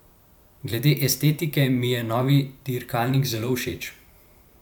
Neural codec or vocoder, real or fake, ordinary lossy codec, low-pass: vocoder, 44.1 kHz, 128 mel bands every 512 samples, BigVGAN v2; fake; none; none